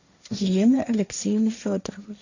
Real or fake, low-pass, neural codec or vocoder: fake; 7.2 kHz; codec, 16 kHz, 1.1 kbps, Voila-Tokenizer